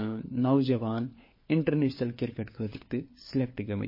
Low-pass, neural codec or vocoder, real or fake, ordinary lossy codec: 5.4 kHz; codec, 16 kHz, 4 kbps, FunCodec, trained on LibriTTS, 50 frames a second; fake; MP3, 24 kbps